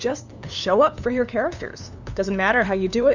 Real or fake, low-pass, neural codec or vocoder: fake; 7.2 kHz; codec, 16 kHz, 2 kbps, FunCodec, trained on LibriTTS, 25 frames a second